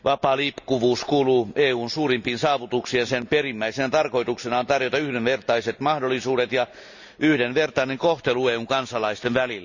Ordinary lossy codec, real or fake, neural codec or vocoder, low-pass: none; real; none; 7.2 kHz